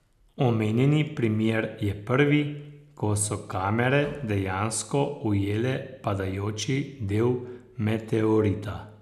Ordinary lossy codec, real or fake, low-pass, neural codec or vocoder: none; real; 14.4 kHz; none